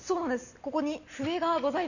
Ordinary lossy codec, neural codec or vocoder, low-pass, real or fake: none; none; 7.2 kHz; real